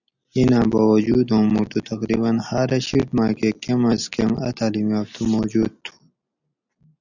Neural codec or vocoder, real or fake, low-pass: none; real; 7.2 kHz